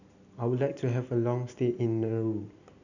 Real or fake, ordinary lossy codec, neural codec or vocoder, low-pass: real; none; none; 7.2 kHz